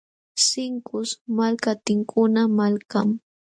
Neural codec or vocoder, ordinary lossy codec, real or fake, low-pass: none; MP3, 64 kbps; real; 9.9 kHz